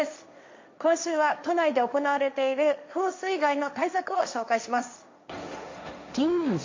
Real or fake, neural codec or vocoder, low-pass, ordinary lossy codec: fake; codec, 16 kHz, 1.1 kbps, Voila-Tokenizer; 7.2 kHz; MP3, 48 kbps